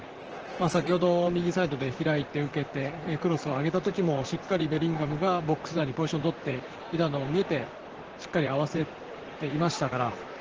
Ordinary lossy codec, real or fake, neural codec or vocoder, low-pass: Opus, 16 kbps; fake; vocoder, 44.1 kHz, 128 mel bands, Pupu-Vocoder; 7.2 kHz